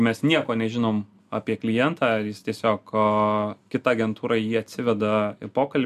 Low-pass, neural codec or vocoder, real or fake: 14.4 kHz; none; real